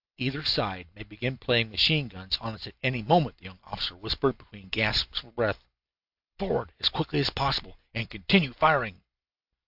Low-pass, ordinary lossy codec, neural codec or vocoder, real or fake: 5.4 kHz; AAC, 48 kbps; none; real